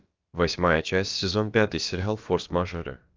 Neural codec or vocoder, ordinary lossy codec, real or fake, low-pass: codec, 16 kHz, about 1 kbps, DyCAST, with the encoder's durations; Opus, 24 kbps; fake; 7.2 kHz